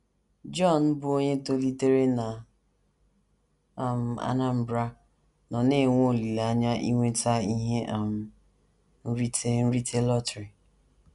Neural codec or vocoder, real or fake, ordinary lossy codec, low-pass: none; real; none; 10.8 kHz